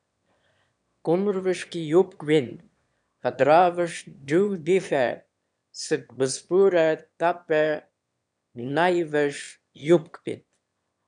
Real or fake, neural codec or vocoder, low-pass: fake; autoencoder, 22.05 kHz, a latent of 192 numbers a frame, VITS, trained on one speaker; 9.9 kHz